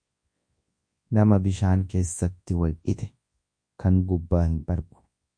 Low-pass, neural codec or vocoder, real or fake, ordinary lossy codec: 9.9 kHz; codec, 24 kHz, 0.9 kbps, WavTokenizer, large speech release; fake; MP3, 48 kbps